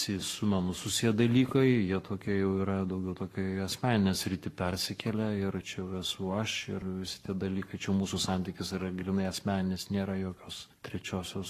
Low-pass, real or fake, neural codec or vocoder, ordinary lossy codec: 14.4 kHz; fake; codec, 44.1 kHz, 7.8 kbps, Pupu-Codec; AAC, 48 kbps